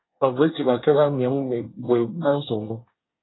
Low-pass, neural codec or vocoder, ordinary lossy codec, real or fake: 7.2 kHz; codec, 24 kHz, 1 kbps, SNAC; AAC, 16 kbps; fake